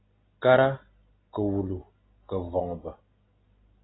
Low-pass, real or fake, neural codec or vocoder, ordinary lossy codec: 7.2 kHz; real; none; AAC, 16 kbps